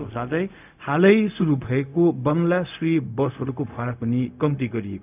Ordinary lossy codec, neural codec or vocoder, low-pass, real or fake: none; codec, 16 kHz, 0.4 kbps, LongCat-Audio-Codec; 3.6 kHz; fake